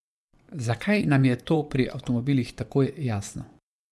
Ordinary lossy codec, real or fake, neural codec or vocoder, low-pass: none; fake; vocoder, 24 kHz, 100 mel bands, Vocos; none